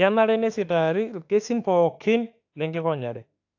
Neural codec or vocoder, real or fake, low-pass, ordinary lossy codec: autoencoder, 48 kHz, 32 numbers a frame, DAC-VAE, trained on Japanese speech; fake; 7.2 kHz; AAC, 48 kbps